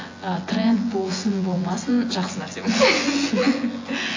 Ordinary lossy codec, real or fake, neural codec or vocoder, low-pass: AAC, 32 kbps; fake; vocoder, 24 kHz, 100 mel bands, Vocos; 7.2 kHz